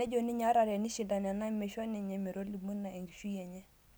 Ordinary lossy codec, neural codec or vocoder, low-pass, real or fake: none; none; none; real